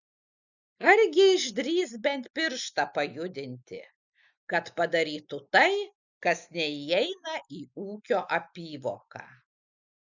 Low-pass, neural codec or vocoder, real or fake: 7.2 kHz; none; real